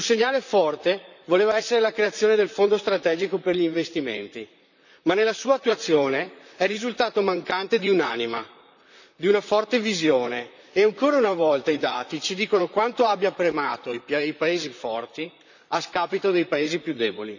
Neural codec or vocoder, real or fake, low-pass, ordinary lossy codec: vocoder, 44.1 kHz, 128 mel bands, Pupu-Vocoder; fake; 7.2 kHz; none